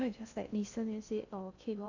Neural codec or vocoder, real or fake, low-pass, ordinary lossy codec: codec, 16 kHz in and 24 kHz out, 0.6 kbps, FocalCodec, streaming, 2048 codes; fake; 7.2 kHz; none